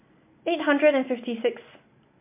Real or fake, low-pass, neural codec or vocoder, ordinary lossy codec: real; 3.6 kHz; none; MP3, 24 kbps